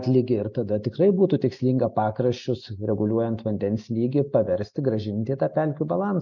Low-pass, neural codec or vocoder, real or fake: 7.2 kHz; none; real